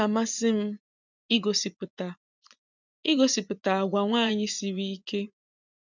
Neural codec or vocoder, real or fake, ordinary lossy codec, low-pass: vocoder, 24 kHz, 100 mel bands, Vocos; fake; none; 7.2 kHz